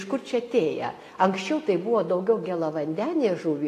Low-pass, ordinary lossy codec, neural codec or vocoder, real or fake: 14.4 kHz; AAC, 48 kbps; none; real